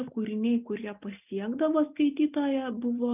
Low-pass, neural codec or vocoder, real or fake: 3.6 kHz; none; real